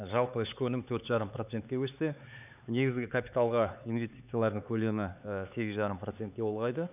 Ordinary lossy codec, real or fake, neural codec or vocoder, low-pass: none; fake; codec, 16 kHz, 4 kbps, X-Codec, HuBERT features, trained on LibriSpeech; 3.6 kHz